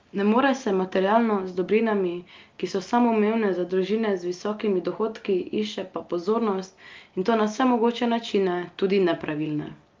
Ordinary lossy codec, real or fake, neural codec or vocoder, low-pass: Opus, 32 kbps; real; none; 7.2 kHz